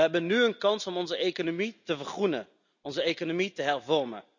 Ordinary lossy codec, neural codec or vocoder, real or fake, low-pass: none; none; real; 7.2 kHz